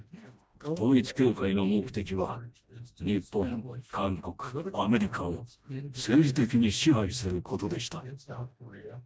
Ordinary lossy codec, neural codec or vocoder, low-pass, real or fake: none; codec, 16 kHz, 1 kbps, FreqCodec, smaller model; none; fake